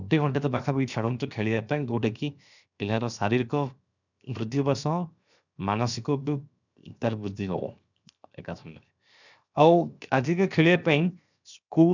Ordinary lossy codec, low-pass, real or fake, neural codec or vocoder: none; 7.2 kHz; fake; codec, 16 kHz, 0.7 kbps, FocalCodec